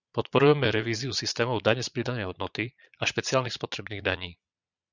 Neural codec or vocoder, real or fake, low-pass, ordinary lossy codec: vocoder, 22.05 kHz, 80 mel bands, Vocos; fake; 7.2 kHz; Opus, 64 kbps